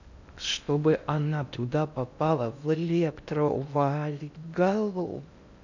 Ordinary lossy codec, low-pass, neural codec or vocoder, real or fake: none; 7.2 kHz; codec, 16 kHz in and 24 kHz out, 0.6 kbps, FocalCodec, streaming, 2048 codes; fake